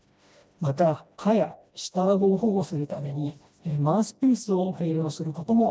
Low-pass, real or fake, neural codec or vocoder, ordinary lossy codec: none; fake; codec, 16 kHz, 1 kbps, FreqCodec, smaller model; none